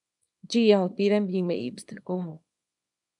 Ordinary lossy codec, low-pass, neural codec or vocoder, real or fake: AAC, 64 kbps; 10.8 kHz; codec, 24 kHz, 0.9 kbps, WavTokenizer, small release; fake